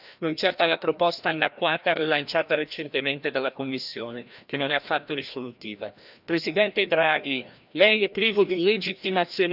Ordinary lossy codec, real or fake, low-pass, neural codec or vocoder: none; fake; 5.4 kHz; codec, 16 kHz, 1 kbps, FreqCodec, larger model